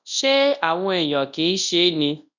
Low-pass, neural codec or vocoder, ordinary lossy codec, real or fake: 7.2 kHz; codec, 24 kHz, 0.9 kbps, WavTokenizer, large speech release; none; fake